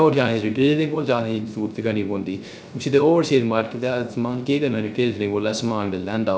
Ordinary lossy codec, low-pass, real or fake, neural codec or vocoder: none; none; fake; codec, 16 kHz, 0.3 kbps, FocalCodec